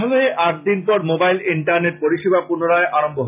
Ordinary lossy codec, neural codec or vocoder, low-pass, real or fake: none; none; 3.6 kHz; real